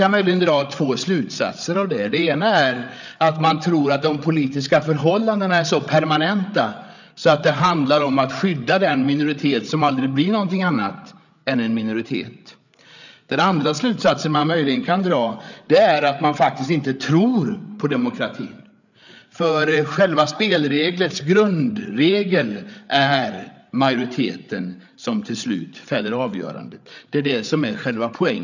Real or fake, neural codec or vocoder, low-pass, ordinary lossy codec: fake; codec, 16 kHz, 8 kbps, FreqCodec, larger model; 7.2 kHz; none